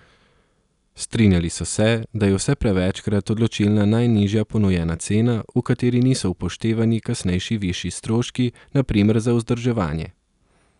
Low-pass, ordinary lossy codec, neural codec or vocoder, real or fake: 10.8 kHz; none; none; real